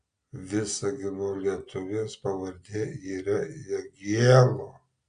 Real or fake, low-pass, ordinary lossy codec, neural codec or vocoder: real; 9.9 kHz; AAC, 96 kbps; none